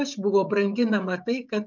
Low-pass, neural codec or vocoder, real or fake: 7.2 kHz; codec, 16 kHz, 16 kbps, FreqCodec, smaller model; fake